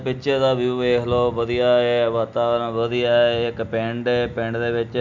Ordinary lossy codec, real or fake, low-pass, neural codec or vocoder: MP3, 64 kbps; real; 7.2 kHz; none